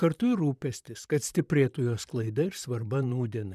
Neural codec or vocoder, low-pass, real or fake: vocoder, 44.1 kHz, 128 mel bands, Pupu-Vocoder; 14.4 kHz; fake